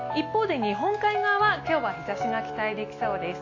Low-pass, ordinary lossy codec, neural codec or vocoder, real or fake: 7.2 kHz; AAC, 32 kbps; none; real